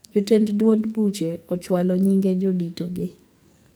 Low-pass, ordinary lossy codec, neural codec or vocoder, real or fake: none; none; codec, 44.1 kHz, 2.6 kbps, SNAC; fake